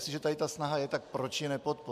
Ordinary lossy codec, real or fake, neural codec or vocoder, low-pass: AAC, 96 kbps; real; none; 14.4 kHz